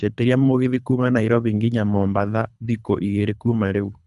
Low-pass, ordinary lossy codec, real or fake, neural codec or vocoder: 10.8 kHz; none; fake; codec, 24 kHz, 3 kbps, HILCodec